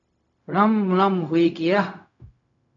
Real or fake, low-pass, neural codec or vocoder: fake; 7.2 kHz; codec, 16 kHz, 0.4 kbps, LongCat-Audio-Codec